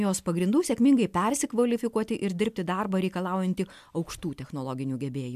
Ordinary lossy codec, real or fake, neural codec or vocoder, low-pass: MP3, 96 kbps; real; none; 14.4 kHz